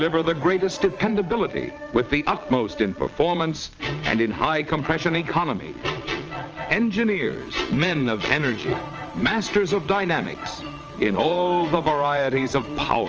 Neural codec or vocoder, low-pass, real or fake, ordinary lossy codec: vocoder, 22.05 kHz, 80 mel bands, Vocos; 7.2 kHz; fake; Opus, 24 kbps